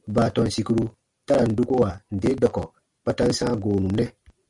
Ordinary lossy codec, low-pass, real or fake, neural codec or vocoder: MP3, 64 kbps; 10.8 kHz; real; none